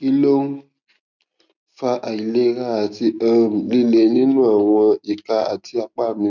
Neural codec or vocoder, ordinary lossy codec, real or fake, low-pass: none; none; real; 7.2 kHz